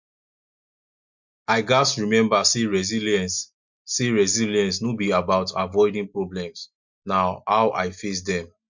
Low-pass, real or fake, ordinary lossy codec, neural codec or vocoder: 7.2 kHz; real; MP3, 48 kbps; none